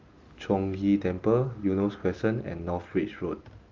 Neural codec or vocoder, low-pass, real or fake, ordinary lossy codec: none; 7.2 kHz; real; Opus, 32 kbps